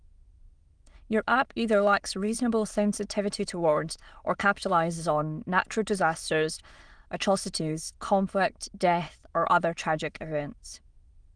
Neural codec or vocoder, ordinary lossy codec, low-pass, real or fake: autoencoder, 22.05 kHz, a latent of 192 numbers a frame, VITS, trained on many speakers; Opus, 24 kbps; 9.9 kHz; fake